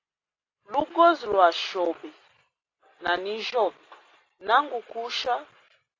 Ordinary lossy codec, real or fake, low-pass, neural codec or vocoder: AAC, 32 kbps; real; 7.2 kHz; none